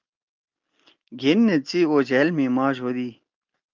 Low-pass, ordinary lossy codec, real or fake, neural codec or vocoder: 7.2 kHz; Opus, 24 kbps; real; none